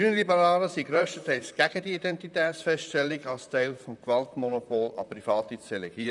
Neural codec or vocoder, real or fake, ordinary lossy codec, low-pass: vocoder, 44.1 kHz, 128 mel bands, Pupu-Vocoder; fake; none; 10.8 kHz